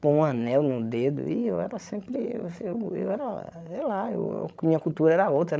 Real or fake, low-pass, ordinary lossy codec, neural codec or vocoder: fake; none; none; codec, 16 kHz, 16 kbps, FreqCodec, larger model